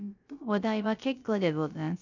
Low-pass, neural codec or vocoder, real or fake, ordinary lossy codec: 7.2 kHz; codec, 16 kHz, 0.3 kbps, FocalCodec; fake; none